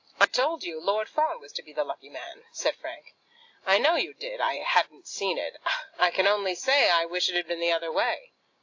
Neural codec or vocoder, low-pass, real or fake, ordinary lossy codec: none; 7.2 kHz; real; AAC, 48 kbps